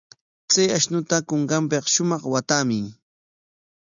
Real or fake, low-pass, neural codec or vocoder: real; 7.2 kHz; none